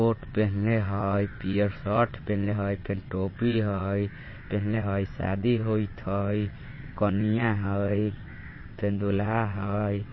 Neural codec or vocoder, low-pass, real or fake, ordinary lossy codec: vocoder, 22.05 kHz, 80 mel bands, WaveNeXt; 7.2 kHz; fake; MP3, 24 kbps